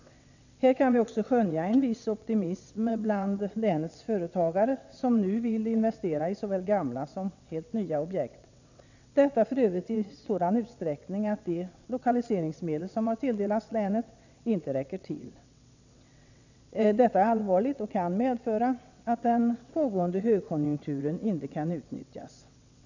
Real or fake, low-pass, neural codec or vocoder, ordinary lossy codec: fake; 7.2 kHz; vocoder, 22.05 kHz, 80 mel bands, WaveNeXt; none